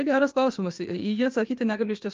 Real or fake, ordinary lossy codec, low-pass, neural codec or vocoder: fake; Opus, 24 kbps; 7.2 kHz; codec, 16 kHz, 0.8 kbps, ZipCodec